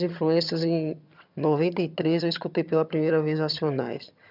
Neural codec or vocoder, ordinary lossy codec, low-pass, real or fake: vocoder, 22.05 kHz, 80 mel bands, HiFi-GAN; none; 5.4 kHz; fake